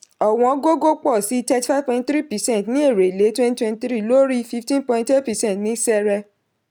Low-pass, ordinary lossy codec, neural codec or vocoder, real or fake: none; none; none; real